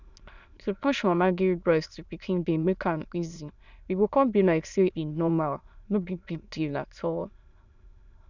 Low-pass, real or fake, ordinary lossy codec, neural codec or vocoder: 7.2 kHz; fake; none; autoencoder, 22.05 kHz, a latent of 192 numbers a frame, VITS, trained on many speakers